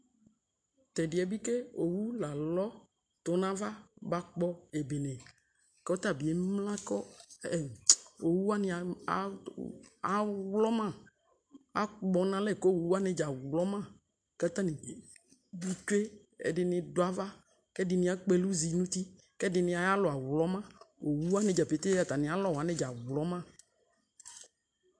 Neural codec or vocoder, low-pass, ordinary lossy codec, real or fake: none; 9.9 kHz; MP3, 64 kbps; real